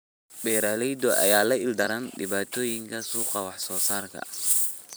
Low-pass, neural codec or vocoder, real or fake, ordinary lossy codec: none; vocoder, 44.1 kHz, 128 mel bands every 256 samples, BigVGAN v2; fake; none